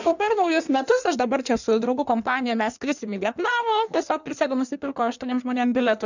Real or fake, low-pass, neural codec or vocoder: fake; 7.2 kHz; codec, 16 kHz in and 24 kHz out, 1.1 kbps, FireRedTTS-2 codec